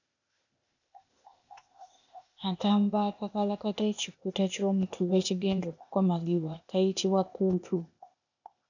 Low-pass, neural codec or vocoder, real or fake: 7.2 kHz; codec, 16 kHz, 0.8 kbps, ZipCodec; fake